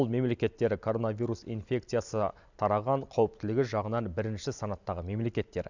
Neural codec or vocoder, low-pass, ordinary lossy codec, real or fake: none; 7.2 kHz; none; real